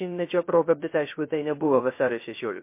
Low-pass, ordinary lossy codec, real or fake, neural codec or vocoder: 3.6 kHz; MP3, 24 kbps; fake; codec, 16 kHz, 0.3 kbps, FocalCodec